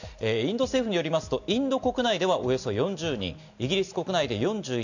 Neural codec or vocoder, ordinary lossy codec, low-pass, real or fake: none; none; 7.2 kHz; real